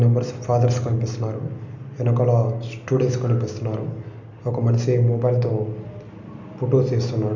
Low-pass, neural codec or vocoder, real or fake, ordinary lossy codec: 7.2 kHz; none; real; none